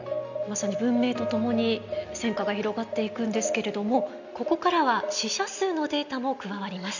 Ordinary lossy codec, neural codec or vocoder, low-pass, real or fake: none; none; 7.2 kHz; real